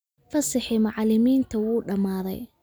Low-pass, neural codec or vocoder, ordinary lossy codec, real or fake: none; none; none; real